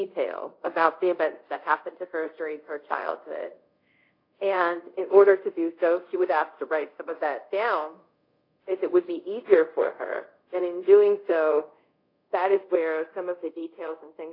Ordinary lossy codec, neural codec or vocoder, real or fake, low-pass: AAC, 32 kbps; codec, 24 kHz, 0.5 kbps, DualCodec; fake; 5.4 kHz